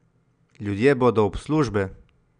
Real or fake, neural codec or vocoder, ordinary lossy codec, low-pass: real; none; none; 9.9 kHz